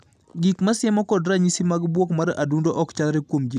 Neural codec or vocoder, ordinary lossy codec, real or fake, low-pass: none; none; real; 14.4 kHz